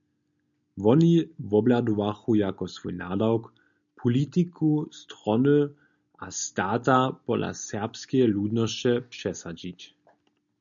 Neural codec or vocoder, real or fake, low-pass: none; real; 7.2 kHz